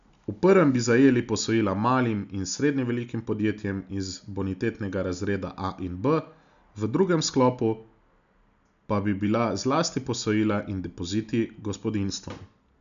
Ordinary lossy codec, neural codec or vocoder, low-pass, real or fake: none; none; 7.2 kHz; real